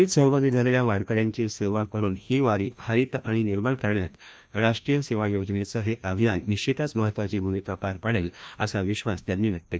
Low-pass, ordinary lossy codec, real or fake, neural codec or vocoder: none; none; fake; codec, 16 kHz, 1 kbps, FreqCodec, larger model